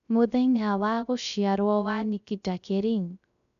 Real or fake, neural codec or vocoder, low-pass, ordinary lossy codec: fake; codec, 16 kHz, 0.3 kbps, FocalCodec; 7.2 kHz; none